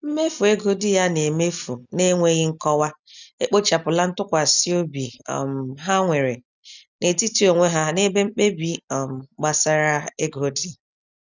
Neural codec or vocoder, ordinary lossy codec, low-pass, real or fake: none; none; 7.2 kHz; real